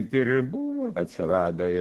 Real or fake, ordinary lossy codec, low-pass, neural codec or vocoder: fake; Opus, 16 kbps; 14.4 kHz; codec, 32 kHz, 1.9 kbps, SNAC